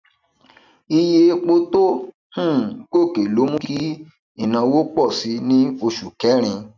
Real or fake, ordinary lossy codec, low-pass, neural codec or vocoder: real; none; 7.2 kHz; none